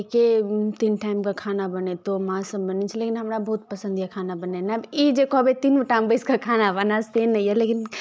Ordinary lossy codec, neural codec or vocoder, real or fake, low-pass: none; none; real; none